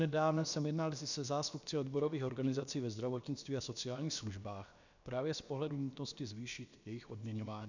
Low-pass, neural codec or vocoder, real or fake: 7.2 kHz; codec, 16 kHz, about 1 kbps, DyCAST, with the encoder's durations; fake